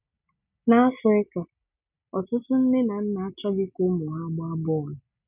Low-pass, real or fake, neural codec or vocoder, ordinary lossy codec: 3.6 kHz; real; none; none